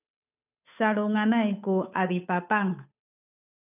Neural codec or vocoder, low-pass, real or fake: codec, 16 kHz, 8 kbps, FunCodec, trained on Chinese and English, 25 frames a second; 3.6 kHz; fake